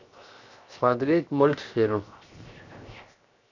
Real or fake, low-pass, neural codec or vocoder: fake; 7.2 kHz; codec, 16 kHz, 0.7 kbps, FocalCodec